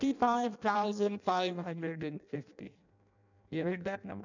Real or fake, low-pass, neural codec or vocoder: fake; 7.2 kHz; codec, 16 kHz in and 24 kHz out, 0.6 kbps, FireRedTTS-2 codec